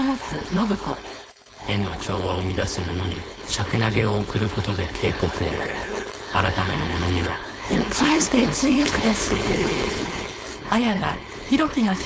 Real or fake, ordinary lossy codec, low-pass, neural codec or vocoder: fake; none; none; codec, 16 kHz, 4.8 kbps, FACodec